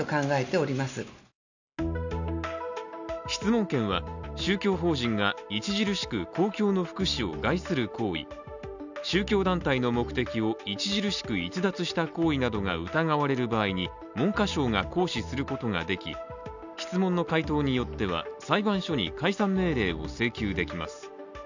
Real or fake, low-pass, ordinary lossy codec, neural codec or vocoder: real; 7.2 kHz; none; none